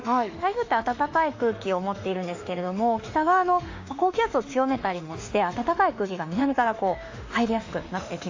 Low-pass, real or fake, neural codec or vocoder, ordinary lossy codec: 7.2 kHz; fake; autoencoder, 48 kHz, 32 numbers a frame, DAC-VAE, trained on Japanese speech; AAC, 48 kbps